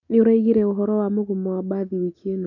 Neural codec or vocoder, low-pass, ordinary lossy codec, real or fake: none; 7.2 kHz; none; real